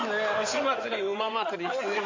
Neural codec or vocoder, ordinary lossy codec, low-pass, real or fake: codec, 16 kHz in and 24 kHz out, 2.2 kbps, FireRedTTS-2 codec; MP3, 48 kbps; 7.2 kHz; fake